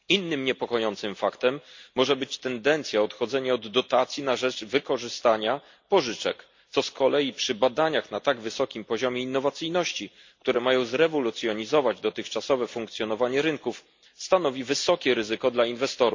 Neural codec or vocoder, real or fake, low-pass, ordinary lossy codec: none; real; 7.2 kHz; MP3, 48 kbps